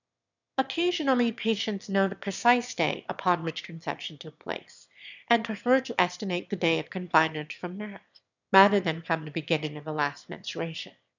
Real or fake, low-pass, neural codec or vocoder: fake; 7.2 kHz; autoencoder, 22.05 kHz, a latent of 192 numbers a frame, VITS, trained on one speaker